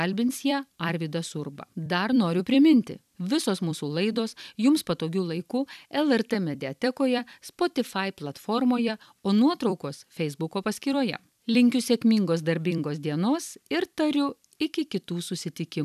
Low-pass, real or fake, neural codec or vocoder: 14.4 kHz; fake; vocoder, 44.1 kHz, 128 mel bands every 256 samples, BigVGAN v2